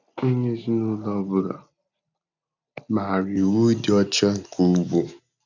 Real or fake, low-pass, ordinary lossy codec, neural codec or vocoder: real; 7.2 kHz; none; none